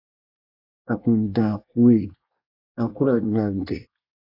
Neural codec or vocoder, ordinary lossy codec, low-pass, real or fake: codec, 24 kHz, 1 kbps, SNAC; AAC, 32 kbps; 5.4 kHz; fake